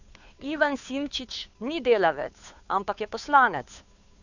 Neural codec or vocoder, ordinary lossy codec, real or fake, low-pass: codec, 16 kHz, 2 kbps, FunCodec, trained on Chinese and English, 25 frames a second; none; fake; 7.2 kHz